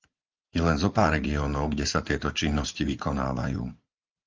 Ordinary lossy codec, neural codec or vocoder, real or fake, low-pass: Opus, 32 kbps; vocoder, 44.1 kHz, 80 mel bands, Vocos; fake; 7.2 kHz